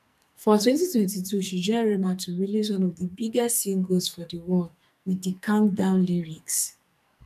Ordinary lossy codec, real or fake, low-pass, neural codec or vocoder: none; fake; 14.4 kHz; codec, 44.1 kHz, 2.6 kbps, SNAC